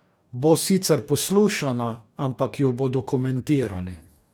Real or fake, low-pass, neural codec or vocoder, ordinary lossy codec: fake; none; codec, 44.1 kHz, 2.6 kbps, DAC; none